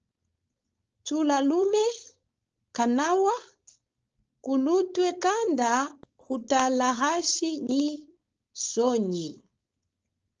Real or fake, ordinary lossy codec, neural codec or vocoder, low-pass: fake; Opus, 24 kbps; codec, 16 kHz, 4.8 kbps, FACodec; 7.2 kHz